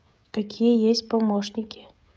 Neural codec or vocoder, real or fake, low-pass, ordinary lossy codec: codec, 16 kHz, 16 kbps, FreqCodec, smaller model; fake; none; none